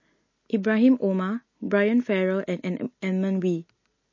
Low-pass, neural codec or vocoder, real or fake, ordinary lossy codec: 7.2 kHz; none; real; MP3, 32 kbps